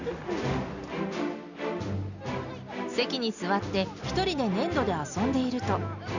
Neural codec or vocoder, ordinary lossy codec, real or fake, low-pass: none; none; real; 7.2 kHz